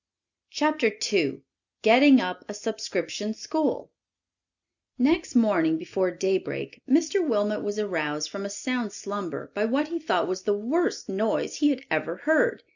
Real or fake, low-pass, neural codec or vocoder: real; 7.2 kHz; none